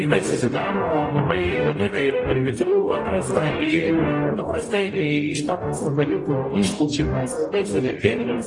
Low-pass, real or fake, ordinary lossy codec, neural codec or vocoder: 10.8 kHz; fake; AAC, 32 kbps; codec, 44.1 kHz, 0.9 kbps, DAC